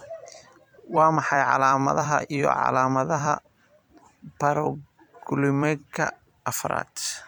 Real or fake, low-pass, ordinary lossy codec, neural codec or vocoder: fake; 19.8 kHz; none; vocoder, 44.1 kHz, 128 mel bands every 256 samples, BigVGAN v2